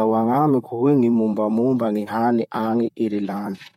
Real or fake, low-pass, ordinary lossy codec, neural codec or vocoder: fake; 19.8 kHz; MP3, 64 kbps; vocoder, 44.1 kHz, 128 mel bands, Pupu-Vocoder